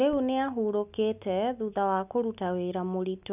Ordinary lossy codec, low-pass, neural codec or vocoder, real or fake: none; 3.6 kHz; none; real